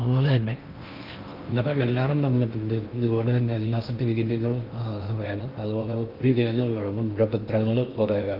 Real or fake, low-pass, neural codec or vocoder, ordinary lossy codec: fake; 5.4 kHz; codec, 16 kHz in and 24 kHz out, 0.6 kbps, FocalCodec, streaming, 2048 codes; Opus, 24 kbps